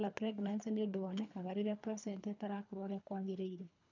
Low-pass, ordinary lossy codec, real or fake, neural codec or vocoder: 7.2 kHz; none; fake; codec, 24 kHz, 3 kbps, HILCodec